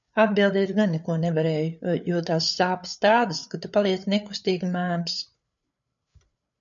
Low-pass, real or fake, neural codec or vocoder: 7.2 kHz; fake; codec, 16 kHz, 8 kbps, FreqCodec, larger model